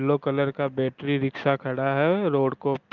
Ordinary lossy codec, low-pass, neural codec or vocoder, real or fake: Opus, 32 kbps; 7.2 kHz; none; real